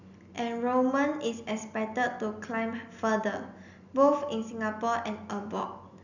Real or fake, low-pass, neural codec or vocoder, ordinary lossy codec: real; 7.2 kHz; none; none